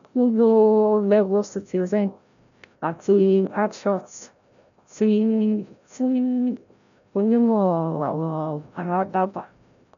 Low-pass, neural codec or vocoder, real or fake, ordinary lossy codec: 7.2 kHz; codec, 16 kHz, 0.5 kbps, FreqCodec, larger model; fake; none